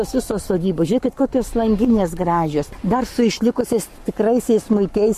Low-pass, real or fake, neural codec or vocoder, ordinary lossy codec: 14.4 kHz; fake; codec, 44.1 kHz, 7.8 kbps, Pupu-Codec; MP3, 64 kbps